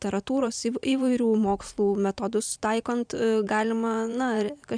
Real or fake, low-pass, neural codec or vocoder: fake; 9.9 kHz; vocoder, 44.1 kHz, 128 mel bands every 512 samples, BigVGAN v2